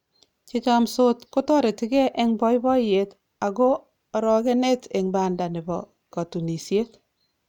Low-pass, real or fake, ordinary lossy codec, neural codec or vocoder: 19.8 kHz; real; none; none